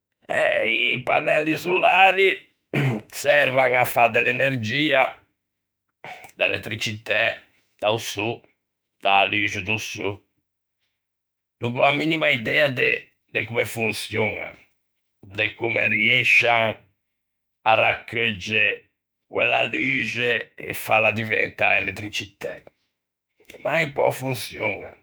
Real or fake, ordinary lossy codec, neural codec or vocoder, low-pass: fake; none; autoencoder, 48 kHz, 32 numbers a frame, DAC-VAE, trained on Japanese speech; none